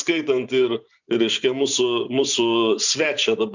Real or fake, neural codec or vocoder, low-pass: fake; vocoder, 24 kHz, 100 mel bands, Vocos; 7.2 kHz